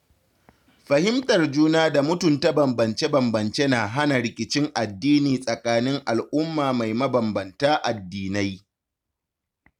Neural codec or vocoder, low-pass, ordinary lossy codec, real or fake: none; 19.8 kHz; none; real